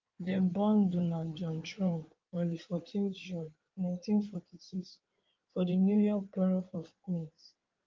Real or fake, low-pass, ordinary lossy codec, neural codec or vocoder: fake; 7.2 kHz; Opus, 24 kbps; codec, 16 kHz in and 24 kHz out, 2.2 kbps, FireRedTTS-2 codec